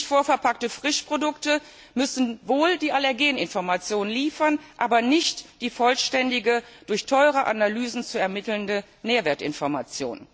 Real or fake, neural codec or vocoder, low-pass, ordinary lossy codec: real; none; none; none